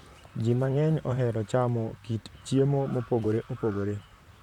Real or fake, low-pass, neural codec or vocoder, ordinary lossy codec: fake; 19.8 kHz; vocoder, 44.1 kHz, 128 mel bands, Pupu-Vocoder; none